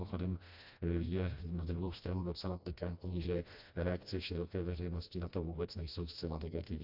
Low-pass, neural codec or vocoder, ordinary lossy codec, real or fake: 5.4 kHz; codec, 16 kHz, 1 kbps, FreqCodec, smaller model; AAC, 48 kbps; fake